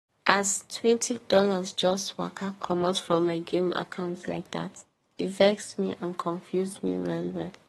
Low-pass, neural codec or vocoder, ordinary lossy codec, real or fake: 14.4 kHz; codec, 32 kHz, 1.9 kbps, SNAC; AAC, 32 kbps; fake